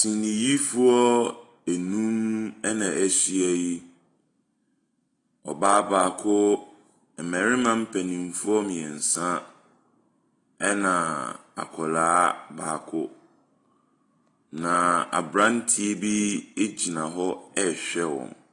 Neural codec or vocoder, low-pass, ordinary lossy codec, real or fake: none; 10.8 kHz; AAC, 32 kbps; real